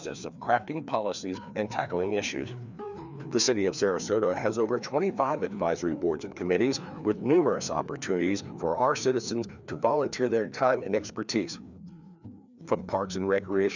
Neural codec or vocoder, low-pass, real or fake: codec, 16 kHz, 2 kbps, FreqCodec, larger model; 7.2 kHz; fake